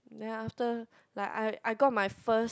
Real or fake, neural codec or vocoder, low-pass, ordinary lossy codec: real; none; none; none